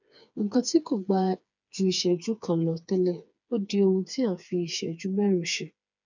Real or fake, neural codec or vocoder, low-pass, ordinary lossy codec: fake; codec, 16 kHz, 4 kbps, FreqCodec, smaller model; 7.2 kHz; none